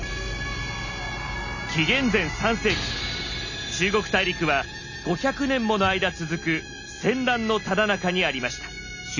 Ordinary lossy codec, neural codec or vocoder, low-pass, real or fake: none; none; 7.2 kHz; real